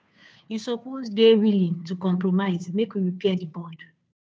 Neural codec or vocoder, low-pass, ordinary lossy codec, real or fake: codec, 16 kHz, 2 kbps, FunCodec, trained on Chinese and English, 25 frames a second; none; none; fake